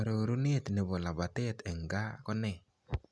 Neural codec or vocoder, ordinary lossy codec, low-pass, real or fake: none; none; none; real